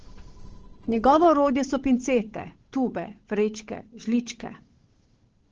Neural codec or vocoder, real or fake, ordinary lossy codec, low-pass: codec, 16 kHz, 8 kbps, FunCodec, trained on Chinese and English, 25 frames a second; fake; Opus, 16 kbps; 7.2 kHz